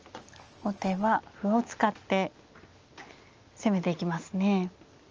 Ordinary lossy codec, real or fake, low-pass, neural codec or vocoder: Opus, 24 kbps; real; 7.2 kHz; none